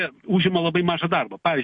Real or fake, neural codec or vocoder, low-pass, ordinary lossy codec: real; none; 9.9 kHz; MP3, 48 kbps